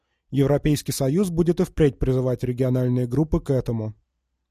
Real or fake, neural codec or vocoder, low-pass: real; none; 14.4 kHz